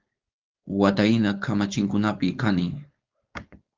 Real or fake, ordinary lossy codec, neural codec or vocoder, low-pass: fake; Opus, 24 kbps; codec, 16 kHz, 4.8 kbps, FACodec; 7.2 kHz